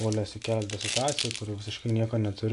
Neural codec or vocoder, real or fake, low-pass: none; real; 10.8 kHz